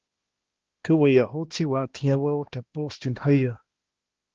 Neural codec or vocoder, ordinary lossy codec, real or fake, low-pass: codec, 16 kHz, 1 kbps, X-Codec, HuBERT features, trained on balanced general audio; Opus, 16 kbps; fake; 7.2 kHz